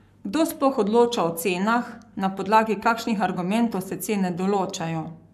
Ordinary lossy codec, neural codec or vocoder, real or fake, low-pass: none; codec, 44.1 kHz, 7.8 kbps, Pupu-Codec; fake; 14.4 kHz